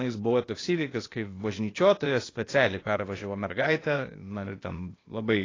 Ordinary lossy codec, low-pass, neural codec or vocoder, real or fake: AAC, 32 kbps; 7.2 kHz; codec, 16 kHz, 0.8 kbps, ZipCodec; fake